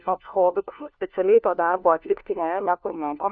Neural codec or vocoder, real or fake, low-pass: codec, 16 kHz, 1 kbps, FunCodec, trained on LibriTTS, 50 frames a second; fake; 7.2 kHz